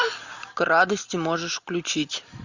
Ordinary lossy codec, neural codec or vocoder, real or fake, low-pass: Opus, 64 kbps; none; real; 7.2 kHz